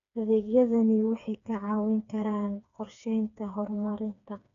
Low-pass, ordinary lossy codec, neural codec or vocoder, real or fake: 7.2 kHz; none; codec, 16 kHz, 4 kbps, FreqCodec, smaller model; fake